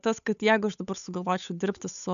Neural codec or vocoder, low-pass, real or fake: codec, 16 kHz, 8 kbps, FunCodec, trained on Chinese and English, 25 frames a second; 7.2 kHz; fake